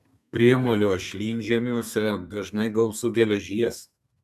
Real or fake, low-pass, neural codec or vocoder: fake; 14.4 kHz; codec, 32 kHz, 1.9 kbps, SNAC